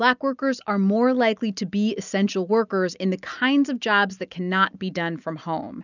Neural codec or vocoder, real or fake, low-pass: none; real; 7.2 kHz